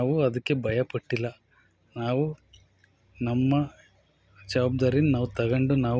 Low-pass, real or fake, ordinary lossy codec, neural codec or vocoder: none; real; none; none